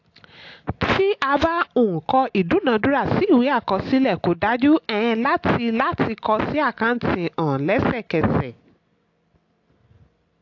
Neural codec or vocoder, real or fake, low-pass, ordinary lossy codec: none; real; 7.2 kHz; AAC, 48 kbps